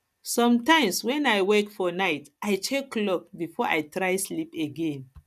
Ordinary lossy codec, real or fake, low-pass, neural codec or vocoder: none; real; 14.4 kHz; none